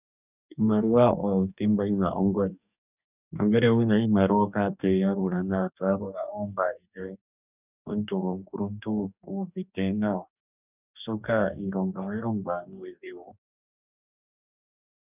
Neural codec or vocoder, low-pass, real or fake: codec, 44.1 kHz, 2.6 kbps, DAC; 3.6 kHz; fake